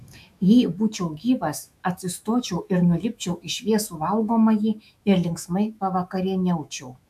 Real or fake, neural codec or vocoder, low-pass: fake; autoencoder, 48 kHz, 128 numbers a frame, DAC-VAE, trained on Japanese speech; 14.4 kHz